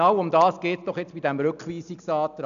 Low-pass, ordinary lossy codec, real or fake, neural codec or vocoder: 7.2 kHz; none; real; none